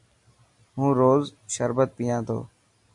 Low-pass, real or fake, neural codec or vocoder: 10.8 kHz; real; none